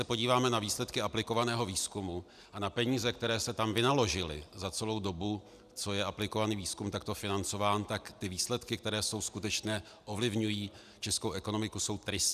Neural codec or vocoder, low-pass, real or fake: none; 14.4 kHz; real